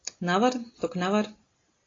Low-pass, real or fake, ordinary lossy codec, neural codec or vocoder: 7.2 kHz; real; AAC, 32 kbps; none